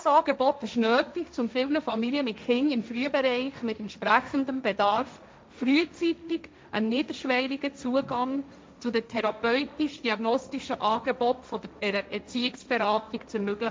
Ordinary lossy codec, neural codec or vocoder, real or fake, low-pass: none; codec, 16 kHz, 1.1 kbps, Voila-Tokenizer; fake; none